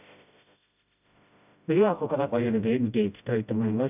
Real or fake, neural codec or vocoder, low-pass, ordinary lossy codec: fake; codec, 16 kHz, 0.5 kbps, FreqCodec, smaller model; 3.6 kHz; none